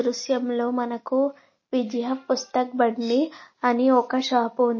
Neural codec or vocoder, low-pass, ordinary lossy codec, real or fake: none; 7.2 kHz; MP3, 32 kbps; real